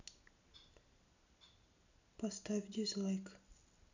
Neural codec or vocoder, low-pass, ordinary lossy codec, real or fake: none; 7.2 kHz; none; real